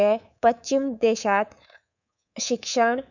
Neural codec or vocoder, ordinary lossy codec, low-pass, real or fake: codec, 24 kHz, 3.1 kbps, DualCodec; none; 7.2 kHz; fake